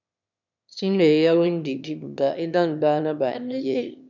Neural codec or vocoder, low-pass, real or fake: autoencoder, 22.05 kHz, a latent of 192 numbers a frame, VITS, trained on one speaker; 7.2 kHz; fake